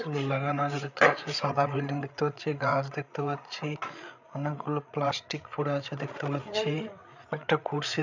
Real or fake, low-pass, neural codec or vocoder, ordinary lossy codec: fake; 7.2 kHz; codec, 16 kHz, 8 kbps, FreqCodec, larger model; none